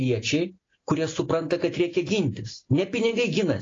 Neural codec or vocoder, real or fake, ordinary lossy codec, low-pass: none; real; AAC, 32 kbps; 7.2 kHz